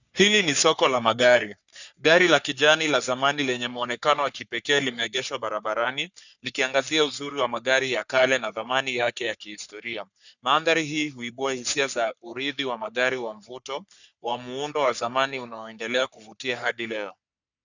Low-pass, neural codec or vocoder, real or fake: 7.2 kHz; codec, 44.1 kHz, 3.4 kbps, Pupu-Codec; fake